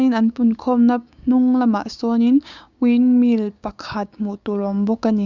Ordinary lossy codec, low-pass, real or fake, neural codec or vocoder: Opus, 64 kbps; 7.2 kHz; fake; codec, 16 kHz, 6 kbps, DAC